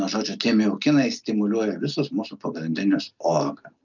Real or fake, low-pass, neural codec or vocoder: real; 7.2 kHz; none